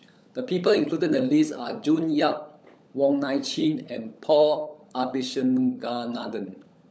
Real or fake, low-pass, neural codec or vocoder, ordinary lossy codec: fake; none; codec, 16 kHz, 16 kbps, FunCodec, trained on LibriTTS, 50 frames a second; none